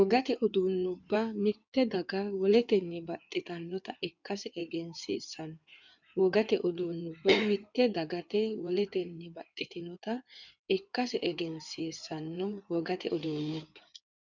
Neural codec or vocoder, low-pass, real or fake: codec, 16 kHz in and 24 kHz out, 2.2 kbps, FireRedTTS-2 codec; 7.2 kHz; fake